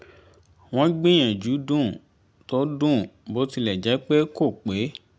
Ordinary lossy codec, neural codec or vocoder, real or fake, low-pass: none; none; real; none